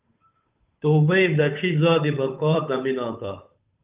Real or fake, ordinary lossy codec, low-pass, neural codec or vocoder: fake; Opus, 24 kbps; 3.6 kHz; codec, 16 kHz, 8 kbps, FunCodec, trained on Chinese and English, 25 frames a second